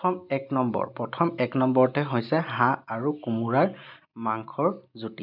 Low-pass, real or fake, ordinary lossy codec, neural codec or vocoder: 5.4 kHz; real; none; none